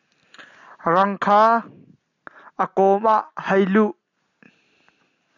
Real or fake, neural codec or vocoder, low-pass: real; none; 7.2 kHz